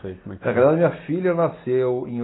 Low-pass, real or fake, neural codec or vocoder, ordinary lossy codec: 7.2 kHz; real; none; AAC, 16 kbps